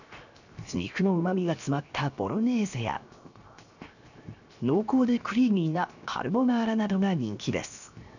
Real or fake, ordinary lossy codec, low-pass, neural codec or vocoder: fake; none; 7.2 kHz; codec, 16 kHz, 0.7 kbps, FocalCodec